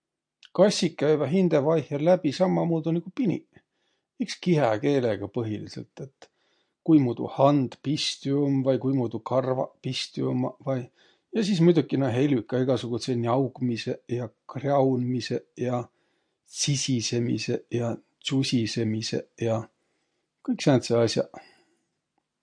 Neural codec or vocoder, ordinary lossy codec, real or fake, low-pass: none; MP3, 48 kbps; real; 9.9 kHz